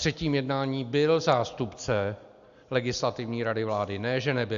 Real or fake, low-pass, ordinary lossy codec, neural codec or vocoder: real; 7.2 kHz; Opus, 64 kbps; none